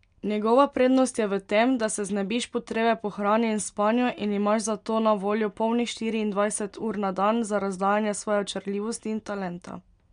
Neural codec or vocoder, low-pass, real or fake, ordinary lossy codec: none; 9.9 kHz; real; MP3, 64 kbps